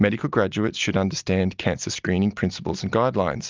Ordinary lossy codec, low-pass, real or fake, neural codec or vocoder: Opus, 24 kbps; 7.2 kHz; real; none